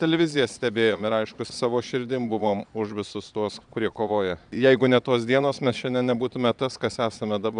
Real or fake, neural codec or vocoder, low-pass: fake; vocoder, 22.05 kHz, 80 mel bands, Vocos; 9.9 kHz